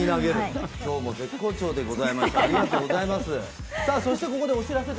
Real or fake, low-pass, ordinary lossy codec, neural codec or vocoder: real; none; none; none